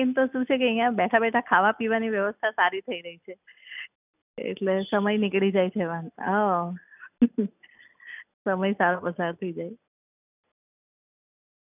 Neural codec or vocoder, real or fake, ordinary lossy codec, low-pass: none; real; none; 3.6 kHz